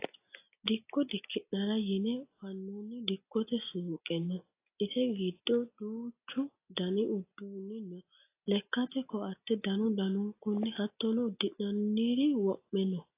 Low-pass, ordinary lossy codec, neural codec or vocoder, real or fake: 3.6 kHz; AAC, 24 kbps; none; real